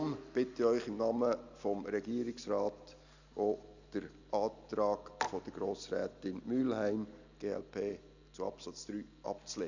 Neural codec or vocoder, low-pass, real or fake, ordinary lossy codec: none; 7.2 kHz; real; none